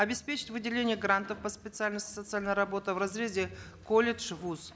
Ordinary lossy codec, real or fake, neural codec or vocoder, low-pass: none; real; none; none